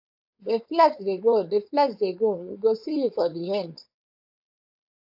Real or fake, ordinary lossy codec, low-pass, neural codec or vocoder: fake; AAC, 48 kbps; 5.4 kHz; codec, 16 kHz, 4.8 kbps, FACodec